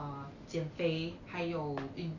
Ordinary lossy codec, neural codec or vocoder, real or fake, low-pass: none; none; real; 7.2 kHz